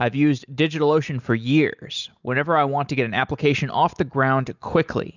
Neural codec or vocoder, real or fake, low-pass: none; real; 7.2 kHz